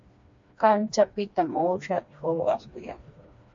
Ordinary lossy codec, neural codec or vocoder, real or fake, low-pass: MP3, 48 kbps; codec, 16 kHz, 2 kbps, FreqCodec, smaller model; fake; 7.2 kHz